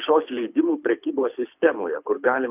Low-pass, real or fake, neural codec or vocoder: 3.6 kHz; fake; codec, 24 kHz, 6 kbps, HILCodec